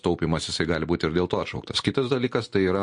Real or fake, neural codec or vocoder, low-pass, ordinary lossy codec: real; none; 9.9 kHz; MP3, 48 kbps